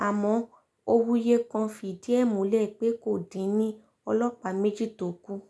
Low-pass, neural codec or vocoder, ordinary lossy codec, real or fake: none; none; none; real